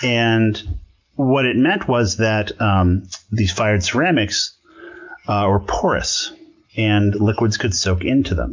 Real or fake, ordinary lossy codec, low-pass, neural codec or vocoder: real; MP3, 64 kbps; 7.2 kHz; none